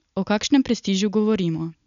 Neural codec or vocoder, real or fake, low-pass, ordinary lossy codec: none; real; 7.2 kHz; none